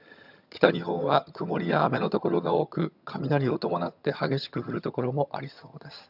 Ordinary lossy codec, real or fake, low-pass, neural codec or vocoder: none; fake; 5.4 kHz; vocoder, 22.05 kHz, 80 mel bands, HiFi-GAN